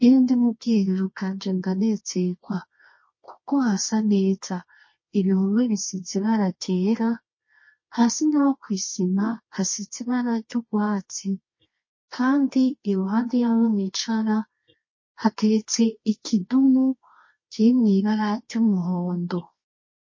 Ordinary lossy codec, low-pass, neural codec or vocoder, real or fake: MP3, 32 kbps; 7.2 kHz; codec, 24 kHz, 0.9 kbps, WavTokenizer, medium music audio release; fake